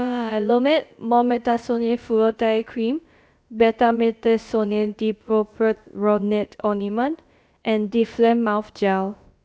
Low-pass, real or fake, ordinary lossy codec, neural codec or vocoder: none; fake; none; codec, 16 kHz, about 1 kbps, DyCAST, with the encoder's durations